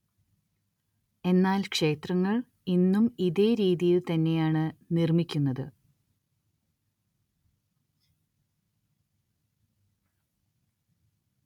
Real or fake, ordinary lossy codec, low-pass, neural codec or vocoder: real; none; 19.8 kHz; none